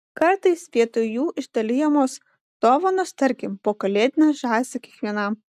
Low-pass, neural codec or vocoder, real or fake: 14.4 kHz; none; real